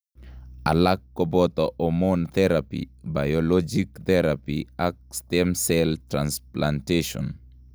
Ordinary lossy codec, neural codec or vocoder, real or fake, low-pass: none; none; real; none